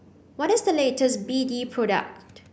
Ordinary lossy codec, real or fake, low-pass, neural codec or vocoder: none; real; none; none